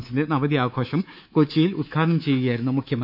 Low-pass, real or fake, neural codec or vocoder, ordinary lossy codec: 5.4 kHz; fake; codec, 24 kHz, 3.1 kbps, DualCodec; none